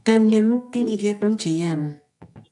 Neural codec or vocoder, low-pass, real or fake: codec, 24 kHz, 0.9 kbps, WavTokenizer, medium music audio release; 10.8 kHz; fake